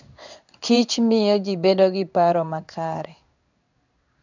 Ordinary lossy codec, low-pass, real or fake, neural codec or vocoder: none; 7.2 kHz; fake; codec, 16 kHz in and 24 kHz out, 1 kbps, XY-Tokenizer